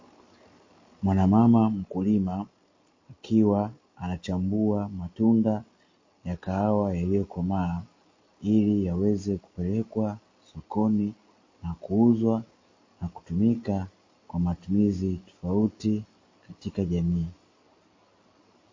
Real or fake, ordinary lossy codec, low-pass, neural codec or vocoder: real; MP3, 32 kbps; 7.2 kHz; none